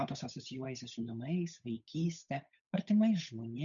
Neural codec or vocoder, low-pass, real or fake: codec, 16 kHz, 8 kbps, FunCodec, trained on Chinese and English, 25 frames a second; 7.2 kHz; fake